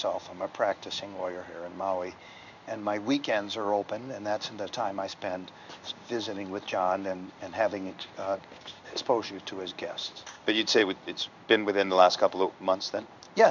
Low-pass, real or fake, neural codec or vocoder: 7.2 kHz; fake; codec, 16 kHz in and 24 kHz out, 1 kbps, XY-Tokenizer